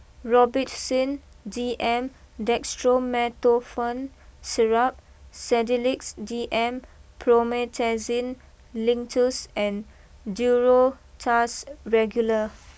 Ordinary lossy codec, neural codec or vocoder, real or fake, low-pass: none; none; real; none